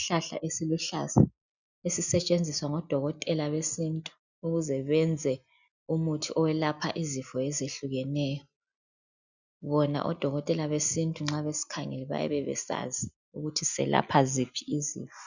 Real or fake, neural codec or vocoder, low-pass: real; none; 7.2 kHz